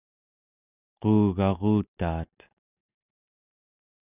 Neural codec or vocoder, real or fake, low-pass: none; real; 3.6 kHz